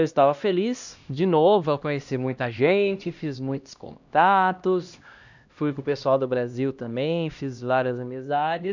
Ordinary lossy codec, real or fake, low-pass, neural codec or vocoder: none; fake; 7.2 kHz; codec, 16 kHz, 1 kbps, X-Codec, HuBERT features, trained on LibriSpeech